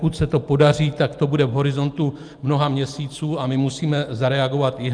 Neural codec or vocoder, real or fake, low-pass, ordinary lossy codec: none; real; 9.9 kHz; Opus, 32 kbps